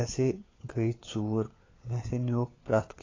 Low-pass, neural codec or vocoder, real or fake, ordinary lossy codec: 7.2 kHz; codec, 16 kHz, 4 kbps, FunCodec, trained on LibriTTS, 50 frames a second; fake; AAC, 32 kbps